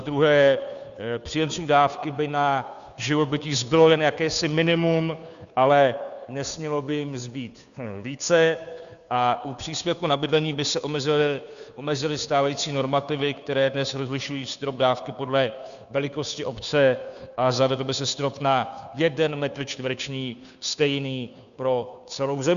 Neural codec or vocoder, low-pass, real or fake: codec, 16 kHz, 2 kbps, FunCodec, trained on Chinese and English, 25 frames a second; 7.2 kHz; fake